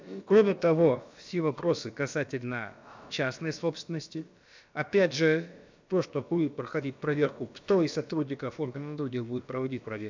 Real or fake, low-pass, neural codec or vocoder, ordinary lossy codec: fake; 7.2 kHz; codec, 16 kHz, about 1 kbps, DyCAST, with the encoder's durations; MP3, 64 kbps